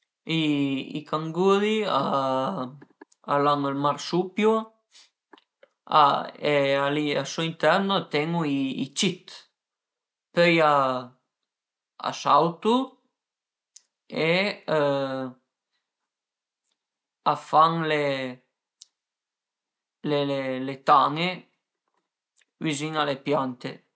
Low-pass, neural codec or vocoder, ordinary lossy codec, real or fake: none; none; none; real